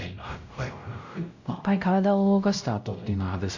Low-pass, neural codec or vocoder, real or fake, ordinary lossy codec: 7.2 kHz; codec, 16 kHz, 0.5 kbps, X-Codec, WavLM features, trained on Multilingual LibriSpeech; fake; none